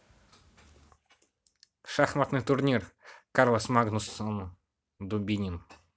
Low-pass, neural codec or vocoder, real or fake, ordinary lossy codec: none; none; real; none